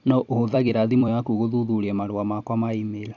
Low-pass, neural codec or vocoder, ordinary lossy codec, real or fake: 7.2 kHz; vocoder, 44.1 kHz, 128 mel bands every 256 samples, BigVGAN v2; none; fake